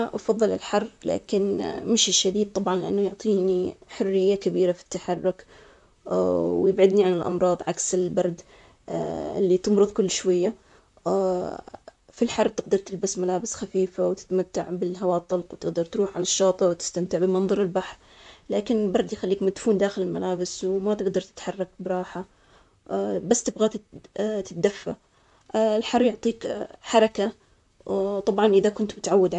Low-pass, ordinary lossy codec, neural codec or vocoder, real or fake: 10.8 kHz; none; vocoder, 44.1 kHz, 128 mel bands, Pupu-Vocoder; fake